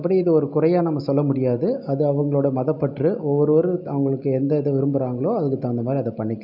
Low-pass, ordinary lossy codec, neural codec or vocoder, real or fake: 5.4 kHz; none; none; real